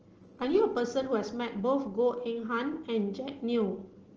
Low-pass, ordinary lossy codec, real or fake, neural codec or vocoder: 7.2 kHz; Opus, 16 kbps; real; none